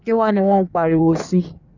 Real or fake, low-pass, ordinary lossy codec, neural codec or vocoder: fake; 7.2 kHz; none; codec, 16 kHz in and 24 kHz out, 1.1 kbps, FireRedTTS-2 codec